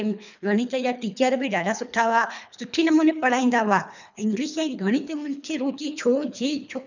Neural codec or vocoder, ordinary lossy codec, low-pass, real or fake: codec, 24 kHz, 3 kbps, HILCodec; none; 7.2 kHz; fake